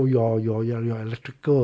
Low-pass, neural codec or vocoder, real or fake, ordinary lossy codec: none; none; real; none